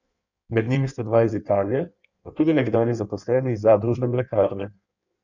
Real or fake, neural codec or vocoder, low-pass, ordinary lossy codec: fake; codec, 16 kHz in and 24 kHz out, 1.1 kbps, FireRedTTS-2 codec; 7.2 kHz; none